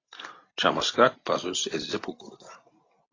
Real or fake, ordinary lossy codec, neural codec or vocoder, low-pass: real; AAC, 32 kbps; none; 7.2 kHz